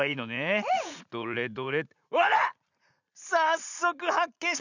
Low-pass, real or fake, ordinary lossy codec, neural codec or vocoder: 7.2 kHz; fake; none; vocoder, 44.1 kHz, 80 mel bands, Vocos